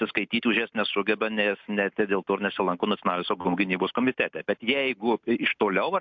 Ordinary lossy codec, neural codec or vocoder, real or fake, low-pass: MP3, 64 kbps; none; real; 7.2 kHz